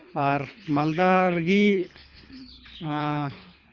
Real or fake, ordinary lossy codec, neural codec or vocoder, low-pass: fake; Opus, 64 kbps; codec, 24 kHz, 3 kbps, HILCodec; 7.2 kHz